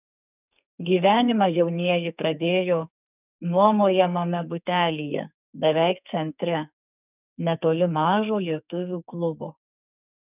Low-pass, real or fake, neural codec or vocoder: 3.6 kHz; fake; codec, 44.1 kHz, 2.6 kbps, SNAC